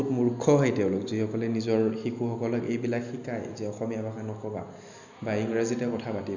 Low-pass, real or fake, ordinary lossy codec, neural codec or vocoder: 7.2 kHz; real; none; none